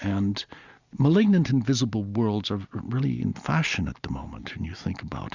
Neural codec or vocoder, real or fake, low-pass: none; real; 7.2 kHz